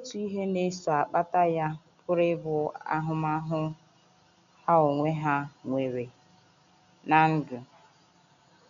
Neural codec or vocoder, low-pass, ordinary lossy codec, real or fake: none; 7.2 kHz; none; real